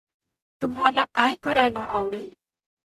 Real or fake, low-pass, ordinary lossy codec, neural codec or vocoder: fake; 14.4 kHz; AAC, 96 kbps; codec, 44.1 kHz, 0.9 kbps, DAC